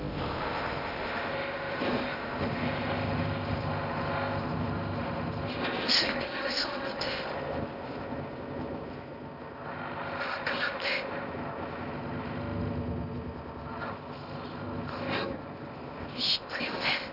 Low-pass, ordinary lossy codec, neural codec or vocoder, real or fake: 5.4 kHz; none; codec, 16 kHz in and 24 kHz out, 0.6 kbps, FocalCodec, streaming, 2048 codes; fake